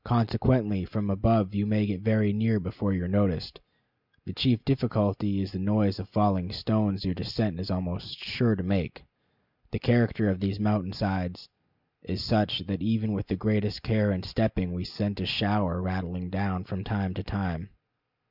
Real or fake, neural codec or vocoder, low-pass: real; none; 5.4 kHz